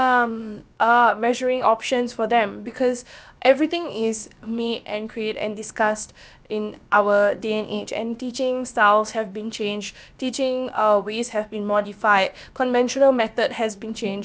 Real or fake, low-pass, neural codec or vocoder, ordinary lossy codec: fake; none; codec, 16 kHz, about 1 kbps, DyCAST, with the encoder's durations; none